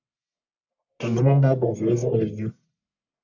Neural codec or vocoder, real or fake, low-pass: codec, 44.1 kHz, 1.7 kbps, Pupu-Codec; fake; 7.2 kHz